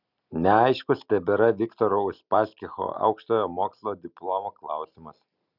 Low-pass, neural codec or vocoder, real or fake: 5.4 kHz; none; real